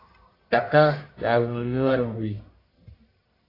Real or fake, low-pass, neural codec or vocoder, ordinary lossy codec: fake; 5.4 kHz; codec, 44.1 kHz, 1.7 kbps, Pupu-Codec; AAC, 24 kbps